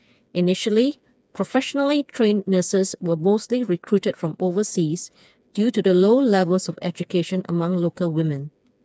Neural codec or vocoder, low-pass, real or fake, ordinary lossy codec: codec, 16 kHz, 4 kbps, FreqCodec, smaller model; none; fake; none